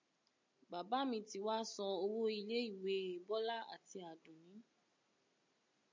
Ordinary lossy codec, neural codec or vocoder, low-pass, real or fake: MP3, 64 kbps; none; 7.2 kHz; real